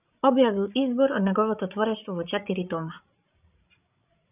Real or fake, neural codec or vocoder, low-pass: fake; codec, 16 kHz, 16 kbps, FreqCodec, larger model; 3.6 kHz